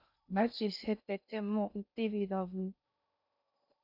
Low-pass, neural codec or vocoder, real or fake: 5.4 kHz; codec, 16 kHz in and 24 kHz out, 0.6 kbps, FocalCodec, streaming, 2048 codes; fake